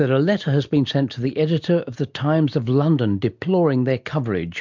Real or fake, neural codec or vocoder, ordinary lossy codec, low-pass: real; none; MP3, 64 kbps; 7.2 kHz